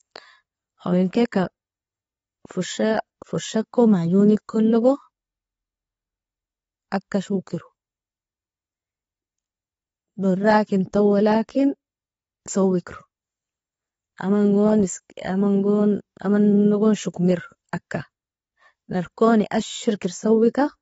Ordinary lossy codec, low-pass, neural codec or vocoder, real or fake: AAC, 24 kbps; 14.4 kHz; none; real